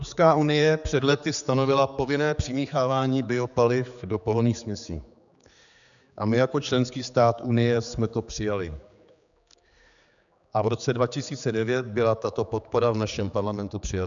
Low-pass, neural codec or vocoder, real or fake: 7.2 kHz; codec, 16 kHz, 4 kbps, X-Codec, HuBERT features, trained on general audio; fake